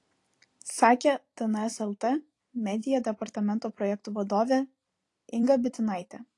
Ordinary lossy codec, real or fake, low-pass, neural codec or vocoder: AAC, 48 kbps; fake; 10.8 kHz; vocoder, 44.1 kHz, 128 mel bands every 256 samples, BigVGAN v2